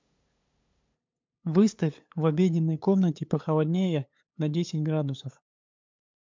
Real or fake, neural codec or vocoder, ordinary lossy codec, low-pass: fake; codec, 16 kHz, 8 kbps, FunCodec, trained on LibriTTS, 25 frames a second; MP3, 64 kbps; 7.2 kHz